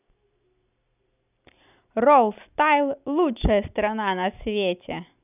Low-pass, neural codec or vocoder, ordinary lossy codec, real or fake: 3.6 kHz; none; none; real